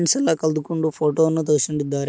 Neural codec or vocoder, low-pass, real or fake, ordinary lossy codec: none; none; real; none